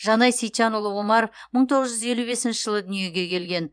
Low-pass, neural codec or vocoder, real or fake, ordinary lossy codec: 9.9 kHz; vocoder, 24 kHz, 100 mel bands, Vocos; fake; none